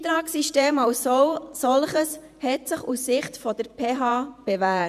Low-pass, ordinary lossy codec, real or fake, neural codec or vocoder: 14.4 kHz; none; fake; vocoder, 48 kHz, 128 mel bands, Vocos